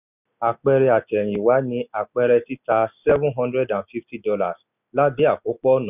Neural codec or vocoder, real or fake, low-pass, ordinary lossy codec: none; real; 3.6 kHz; none